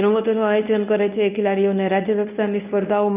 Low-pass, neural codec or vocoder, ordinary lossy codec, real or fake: 3.6 kHz; codec, 24 kHz, 0.9 kbps, WavTokenizer, medium speech release version 1; none; fake